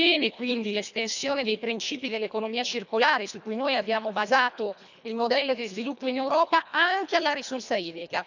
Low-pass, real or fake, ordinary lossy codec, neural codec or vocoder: 7.2 kHz; fake; none; codec, 24 kHz, 1.5 kbps, HILCodec